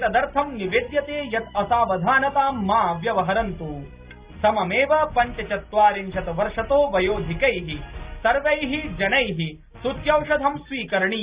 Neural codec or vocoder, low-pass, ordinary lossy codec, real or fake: none; 3.6 kHz; Opus, 64 kbps; real